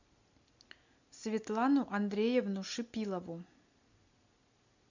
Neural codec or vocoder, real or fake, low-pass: none; real; 7.2 kHz